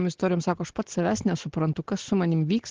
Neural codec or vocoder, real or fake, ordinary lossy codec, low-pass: none; real; Opus, 16 kbps; 7.2 kHz